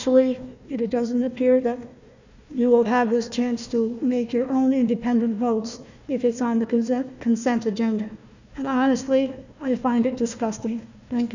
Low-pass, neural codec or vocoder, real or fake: 7.2 kHz; codec, 16 kHz, 1 kbps, FunCodec, trained on Chinese and English, 50 frames a second; fake